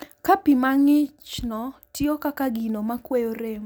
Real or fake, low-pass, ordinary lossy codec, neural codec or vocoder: real; none; none; none